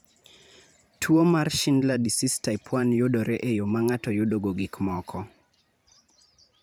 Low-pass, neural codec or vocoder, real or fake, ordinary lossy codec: none; vocoder, 44.1 kHz, 128 mel bands every 512 samples, BigVGAN v2; fake; none